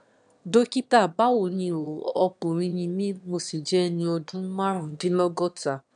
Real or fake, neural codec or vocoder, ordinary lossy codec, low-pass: fake; autoencoder, 22.05 kHz, a latent of 192 numbers a frame, VITS, trained on one speaker; none; 9.9 kHz